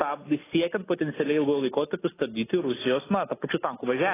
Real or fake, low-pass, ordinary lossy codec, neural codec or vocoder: real; 3.6 kHz; AAC, 16 kbps; none